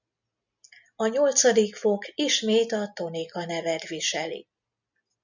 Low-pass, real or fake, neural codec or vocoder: 7.2 kHz; real; none